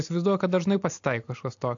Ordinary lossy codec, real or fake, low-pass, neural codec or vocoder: AAC, 64 kbps; real; 7.2 kHz; none